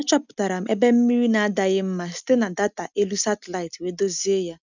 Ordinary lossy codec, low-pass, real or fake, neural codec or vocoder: none; 7.2 kHz; real; none